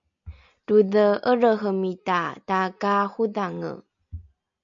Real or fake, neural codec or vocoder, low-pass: real; none; 7.2 kHz